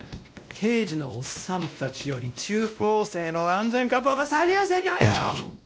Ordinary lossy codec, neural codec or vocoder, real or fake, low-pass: none; codec, 16 kHz, 1 kbps, X-Codec, WavLM features, trained on Multilingual LibriSpeech; fake; none